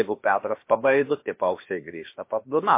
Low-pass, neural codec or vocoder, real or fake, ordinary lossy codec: 3.6 kHz; codec, 16 kHz, about 1 kbps, DyCAST, with the encoder's durations; fake; MP3, 24 kbps